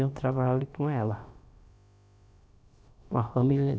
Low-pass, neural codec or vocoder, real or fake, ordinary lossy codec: none; codec, 16 kHz, about 1 kbps, DyCAST, with the encoder's durations; fake; none